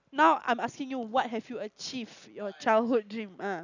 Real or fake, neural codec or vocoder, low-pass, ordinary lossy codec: real; none; 7.2 kHz; none